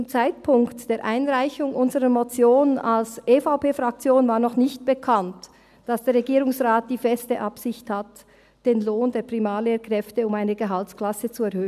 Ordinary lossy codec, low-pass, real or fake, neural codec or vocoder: none; 14.4 kHz; real; none